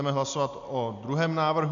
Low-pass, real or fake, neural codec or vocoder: 7.2 kHz; real; none